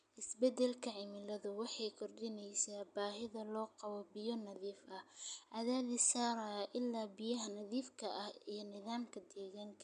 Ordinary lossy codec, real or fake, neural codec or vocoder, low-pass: none; real; none; 9.9 kHz